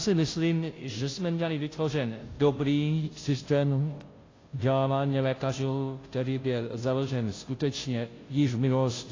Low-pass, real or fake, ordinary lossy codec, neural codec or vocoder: 7.2 kHz; fake; AAC, 32 kbps; codec, 16 kHz, 0.5 kbps, FunCodec, trained on Chinese and English, 25 frames a second